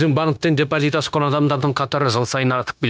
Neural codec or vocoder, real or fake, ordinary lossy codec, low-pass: codec, 16 kHz, 0.9 kbps, LongCat-Audio-Codec; fake; none; none